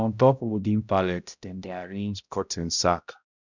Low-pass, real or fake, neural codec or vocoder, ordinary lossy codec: 7.2 kHz; fake; codec, 16 kHz, 0.5 kbps, X-Codec, HuBERT features, trained on balanced general audio; none